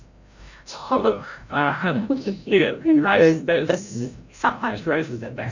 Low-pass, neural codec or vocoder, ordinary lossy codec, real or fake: 7.2 kHz; codec, 16 kHz, 0.5 kbps, FreqCodec, larger model; none; fake